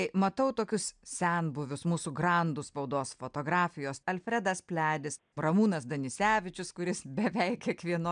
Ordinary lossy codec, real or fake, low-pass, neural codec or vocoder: MP3, 96 kbps; real; 9.9 kHz; none